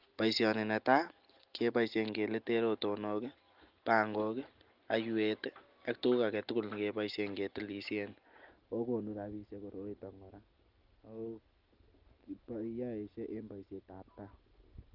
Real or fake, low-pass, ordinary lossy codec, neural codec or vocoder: real; 5.4 kHz; Opus, 32 kbps; none